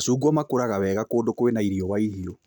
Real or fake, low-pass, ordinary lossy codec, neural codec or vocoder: real; none; none; none